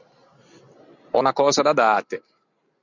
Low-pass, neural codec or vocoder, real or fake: 7.2 kHz; none; real